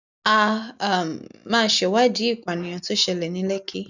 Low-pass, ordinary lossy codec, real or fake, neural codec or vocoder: 7.2 kHz; none; real; none